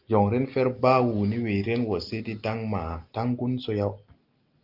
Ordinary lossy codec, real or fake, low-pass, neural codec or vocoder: Opus, 24 kbps; real; 5.4 kHz; none